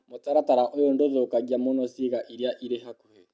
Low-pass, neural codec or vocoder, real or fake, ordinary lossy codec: none; none; real; none